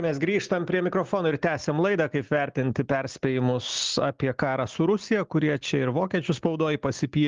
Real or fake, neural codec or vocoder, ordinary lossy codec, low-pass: real; none; Opus, 32 kbps; 7.2 kHz